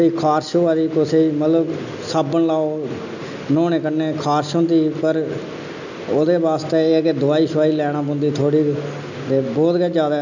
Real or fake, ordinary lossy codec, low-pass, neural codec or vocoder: real; none; 7.2 kHz; none